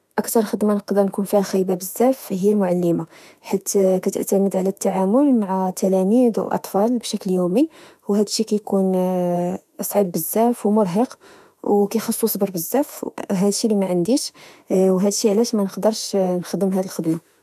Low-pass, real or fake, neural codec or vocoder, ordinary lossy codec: 14.4 kHz; fake; autoencoder, 48 kHz, 32 numbers a frame, DAC-VAE, trained on Japanese speech; none